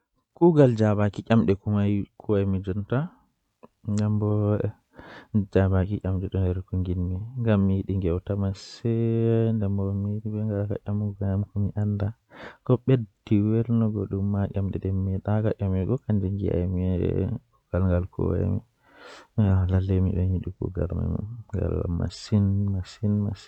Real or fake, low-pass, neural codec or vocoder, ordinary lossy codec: real; 19.8 kHz; none; none